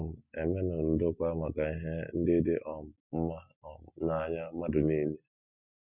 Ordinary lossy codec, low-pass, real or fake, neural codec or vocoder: none; 3.6 kHz; real; none